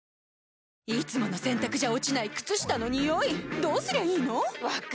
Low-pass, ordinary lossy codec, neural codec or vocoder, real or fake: none; none; none; real